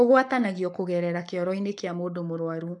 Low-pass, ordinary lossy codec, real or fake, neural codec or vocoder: 10.8 kHz; none; fake; codec, 44.1 kHz, 7.8 kbps, Pupu-Codec